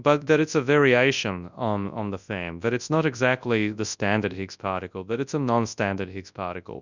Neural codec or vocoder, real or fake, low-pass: codec, 24 kHz, 0.9 kbps, WavTokenizer, large speech release; fake; 7.2 kHz